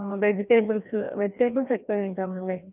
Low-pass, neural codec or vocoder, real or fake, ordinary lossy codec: 3.6 kHz; codec, 16 kHz, 1 kbps, FreqCodec, larger model; fake; none